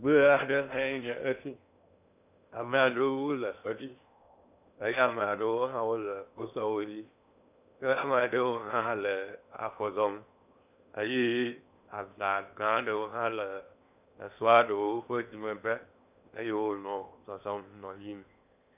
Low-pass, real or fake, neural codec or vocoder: 3.6 kHz; fake; codec, 16 kHz in and 24 kHz out, 0.8 kbps, FocalCodec, streaming, 65536 codes